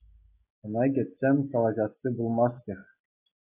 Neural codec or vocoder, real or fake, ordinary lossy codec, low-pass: none; real; AAC, 24 kbps; 3.6 kHz